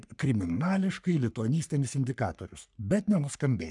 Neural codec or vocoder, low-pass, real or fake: codec, 44.1 kHz, 3.4 kbps, Pupu-Codec; 10.8 kHz; fake